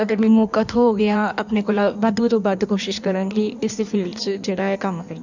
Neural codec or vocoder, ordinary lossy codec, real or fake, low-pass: codec, 16 kHz in and 24 kHz out, 1.1 kbps, FireRedTTS-2 codec; none; fake; 7.2 kHz